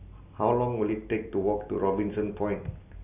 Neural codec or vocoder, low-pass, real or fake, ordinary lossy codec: none; 3.6 kHz; real; none